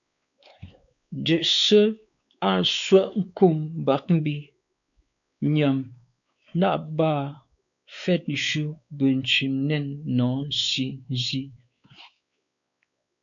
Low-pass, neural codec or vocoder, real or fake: 7.2 kHz; codec, 16 kHz, 4 kbps, X-Codec, WavLM features, trained on Multilingual LibriSpeech; fake